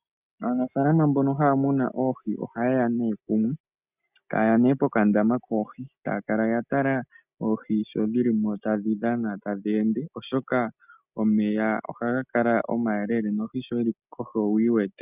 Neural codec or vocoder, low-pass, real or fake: none; 3.6 kHz; real